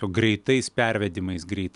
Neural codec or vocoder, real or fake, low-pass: none; real; 10.8 kHz